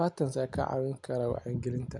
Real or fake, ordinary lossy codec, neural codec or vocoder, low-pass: real; none; none; 10.8 kHz